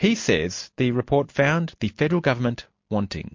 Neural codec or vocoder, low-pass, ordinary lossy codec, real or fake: none; 7.2 kHz; MP3, 48 kbps; real